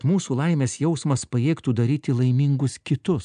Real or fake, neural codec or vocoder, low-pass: real; none; 9.9 kHz